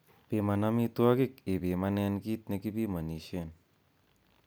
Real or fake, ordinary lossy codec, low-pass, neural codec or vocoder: real; none; none; none